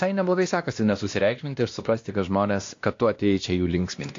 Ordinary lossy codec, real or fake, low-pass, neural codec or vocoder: AAC, 48 kbps; fake; 7.2 kHz; codec, 16 kHz, 1 kbps, X-Codec, WavLM features, trained on Multilingual LibriSpeech